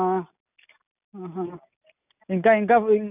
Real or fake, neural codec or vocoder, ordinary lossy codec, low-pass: real; none; none; 3.6 kHz